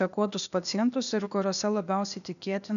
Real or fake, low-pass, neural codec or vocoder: fake; 7.2 kHz; codec, 16 kHz, 0.8 kbps, ZipCodec